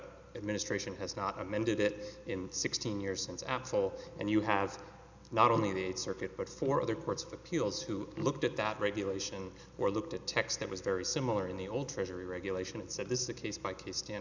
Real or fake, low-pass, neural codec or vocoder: real; 7.2 kHz; none